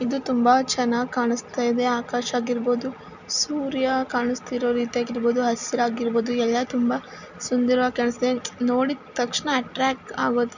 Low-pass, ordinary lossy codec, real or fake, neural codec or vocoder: 7.2 kHz; none; real; none